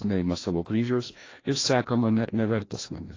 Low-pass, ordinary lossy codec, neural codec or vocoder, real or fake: 7.2 kHz; AAC, 32 kbps; codec, 16 kHz, 1 kbps, FreqCodec, larger model; fake